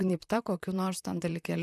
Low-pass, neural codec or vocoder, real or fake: 14.4 kHz; vocoder, 44.1 kHz, 128 mel bands, Pupu-Vocoder; fake